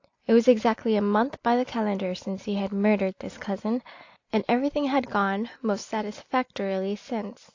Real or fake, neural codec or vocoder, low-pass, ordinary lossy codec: real; none; 7.2 kHz; AAC, 48 kbps